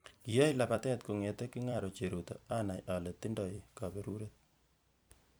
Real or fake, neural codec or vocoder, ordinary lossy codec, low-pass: fake; vocoder, 44.1 kHz, 128 mel bands every 256 samples, BigVGAN v2; none; none